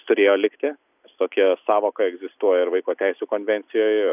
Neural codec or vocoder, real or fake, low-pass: none; real; 3.6 kHz